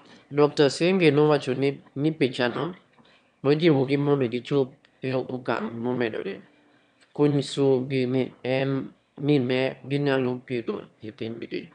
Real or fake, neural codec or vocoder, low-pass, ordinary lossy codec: fake; autoencoder, 22.05 kHz, a latent of 192 numbers a frame, VITS, trained on one speaker; 9.9 kHz; none